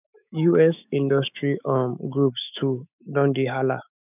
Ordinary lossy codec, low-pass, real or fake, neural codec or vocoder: none; 3.6 kHz; fake; autoencoder, 48 kHz, 128 numbers a frame, DAC-VAE, trained on Japanese speech